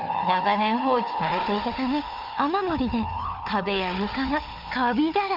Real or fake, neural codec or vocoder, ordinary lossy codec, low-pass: fake; codec, 16 kHz, 4 kbps, FunCodec, trained on Chinese and English, 50 frames a second; none; 5.4 kHz